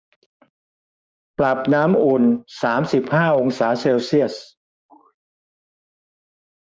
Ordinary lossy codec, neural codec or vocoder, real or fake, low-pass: none; codec, 16 kHz, 6 kbps, DAC; fake; none